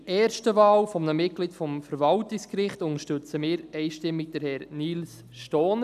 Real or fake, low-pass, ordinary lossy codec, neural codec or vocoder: real; 14.4 kHz; none; none